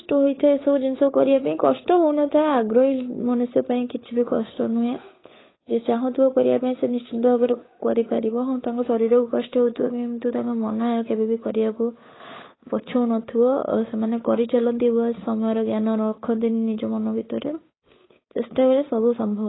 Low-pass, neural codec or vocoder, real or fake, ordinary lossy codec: 7.2 kHz; codec, 16 kHz, 8 kbps, FunCodec, trained on Chinese and English, 25 frames a second; fake; AAC, 16 kbps